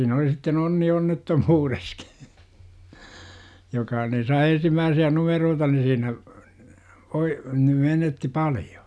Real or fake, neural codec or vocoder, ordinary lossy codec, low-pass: real; none; none; none